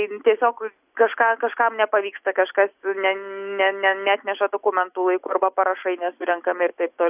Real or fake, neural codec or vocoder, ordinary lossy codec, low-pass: real; none; AAC, 32 kbps; 3.6 kHz